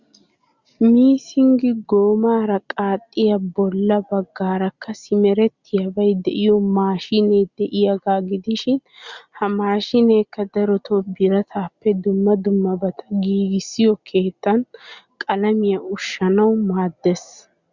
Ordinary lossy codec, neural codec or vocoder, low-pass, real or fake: Opus, 64 kbps; none; 7.2 kHz; real